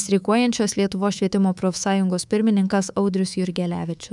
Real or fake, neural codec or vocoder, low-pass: fake; codec, 24 kHz, 3.1 kbps, DualCodec; 10.8 kHz